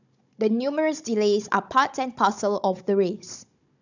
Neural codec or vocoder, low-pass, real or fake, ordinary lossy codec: codec, 16 kHz, 16 kbps, FunCodec, trained on Chinese and English, 50 frames a second; 7.2 kHz; fake; none